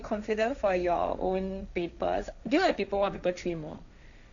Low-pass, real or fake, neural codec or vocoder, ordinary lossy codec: 7.2 kHz; fake; codec, 16 kHz, 1.1 kbps, Voila-Tokenizer; none